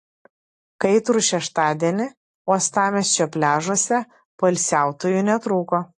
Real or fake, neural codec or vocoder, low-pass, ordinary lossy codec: real; none; 10.8 kHz; AAC, 48 kbps